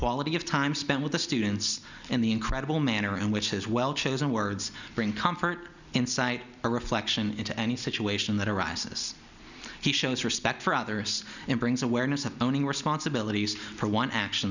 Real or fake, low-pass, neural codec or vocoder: real; 7.2 kHz; none